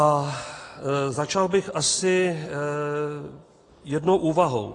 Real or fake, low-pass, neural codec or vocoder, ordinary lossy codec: real; 10.8 kHz; none; AAC, 32 kbps